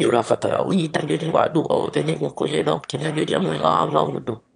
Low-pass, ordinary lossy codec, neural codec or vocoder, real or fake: 9.9 kHz; none; autoencoder, 22.05 kHz, a latent of 192 numbers a frame, VITS, trained on one speaker; fake